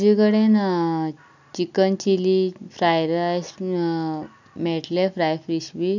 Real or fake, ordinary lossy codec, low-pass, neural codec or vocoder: real; none; 7.2 kHz; none